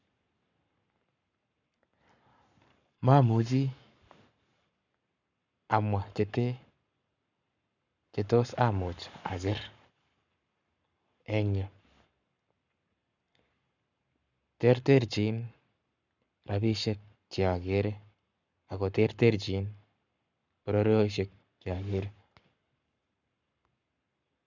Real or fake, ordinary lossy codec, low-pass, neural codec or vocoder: fake; none; 7.2 kHz; codec, 44.1 kHz, 7.8 kbps, Pupu-Codec